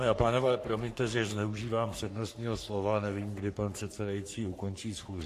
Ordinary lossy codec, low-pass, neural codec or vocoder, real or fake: AAC, 64 kbps; 14.4 kHz; codec, 44.1 kHz, 3.4 kbps, Pupu-Codec; fake